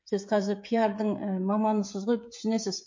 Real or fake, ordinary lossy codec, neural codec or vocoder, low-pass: fake; MP3, 48 kbps; codec, 16 kHz, 16 kbps, FreqCodec, smaller model; 7.2 kHz